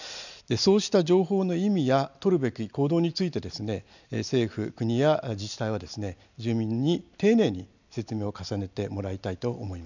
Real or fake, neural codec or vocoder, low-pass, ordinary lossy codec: real; none; 7.2 kHz; none